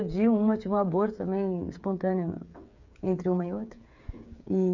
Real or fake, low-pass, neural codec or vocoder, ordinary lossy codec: fake; 7.2 kHz; codec, 16 kHz, 8 kbps, FreqCodec, smaller model; none